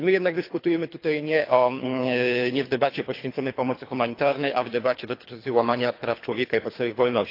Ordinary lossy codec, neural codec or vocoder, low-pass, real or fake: AAC, 32 kbps; codec, 24 kHz, 3 kbps, HILCodec; 5.4 kHz; fake